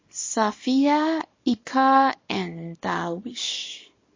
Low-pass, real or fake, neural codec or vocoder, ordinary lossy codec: 7.2 kHz; fake; codec, 24 kHz, 0.9 kbps, WavTokenizer, small release; MP3, 32 kbps